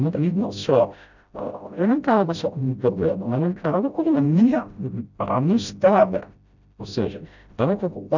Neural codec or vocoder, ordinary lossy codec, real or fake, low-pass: codec, 16 kHz, 0.5 kbps, FreqCodec, smaller model; none; fake; 7.2 kHz